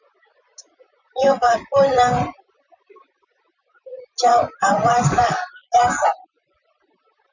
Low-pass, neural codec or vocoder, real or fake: 7.2 kHz; vocoder, 44.1 kHz, 128 mel bands, Pupu-Vocoder; fake